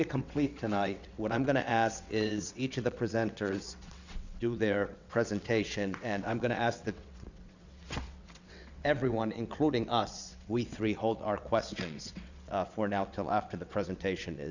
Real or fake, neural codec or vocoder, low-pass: fake; vocoder, 22.05 kHz, 80 mel bands, WaveNeXt; 7.2 kHz